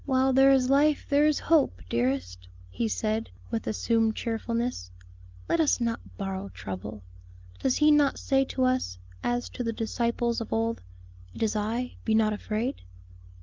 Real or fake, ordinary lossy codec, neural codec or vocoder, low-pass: real; Opus, 24 kbps; none; 7.2 kHz